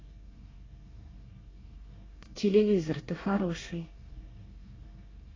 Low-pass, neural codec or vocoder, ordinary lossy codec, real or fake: 7.2 kHz; codec, 24 kHz, 1 kbps, SNAC; AAC, 32 kbps; fake